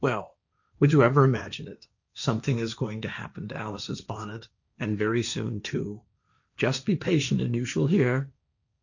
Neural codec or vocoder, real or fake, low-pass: codec, 16 kHz, 1.1 kbps, Voila-Tokenizer; fake; 7.2 kHz